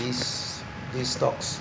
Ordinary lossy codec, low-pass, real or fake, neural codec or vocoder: none; none; real; none